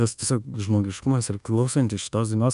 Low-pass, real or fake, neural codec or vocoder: 10.8 kHz; fake; codec, 24 kHz, 1.2 kbps, DualCodec